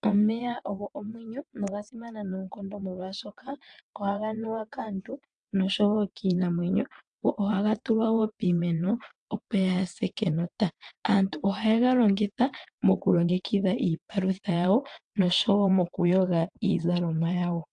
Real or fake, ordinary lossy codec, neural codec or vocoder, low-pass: fake; AAC, 64 kbps; vocoder, 22.05 kHz, 80 mel bands, WaveNeXt; 9.9 kHz